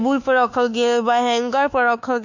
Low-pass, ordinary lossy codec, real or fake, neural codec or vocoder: 7.2 kHz; none; fake; codec, 24 kHz, 1.2 kbps, DualCodec